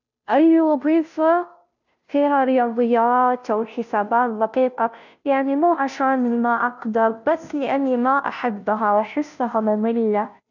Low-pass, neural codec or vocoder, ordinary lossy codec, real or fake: 7.2 kHz; codec, 16 kHz, 0.5 kbps, FunCodec, trained on Chinese and English, 25 frames a second; none; fake